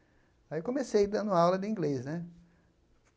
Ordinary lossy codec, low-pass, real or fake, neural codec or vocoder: none; none; real; none